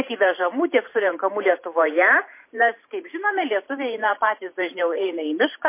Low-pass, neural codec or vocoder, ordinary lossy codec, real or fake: 3.6 kHz; vocoder, 44.1 kHz, 128 mel bands every 512 samples, BigVGAN v2; MP3, 24 kbps; fake